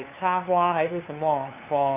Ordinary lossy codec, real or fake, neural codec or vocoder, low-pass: none; fake; codec, 16 kHz, 2 kbps, FunCodec, trained on LibriTTS, 25 frames a second; 3.6 kHz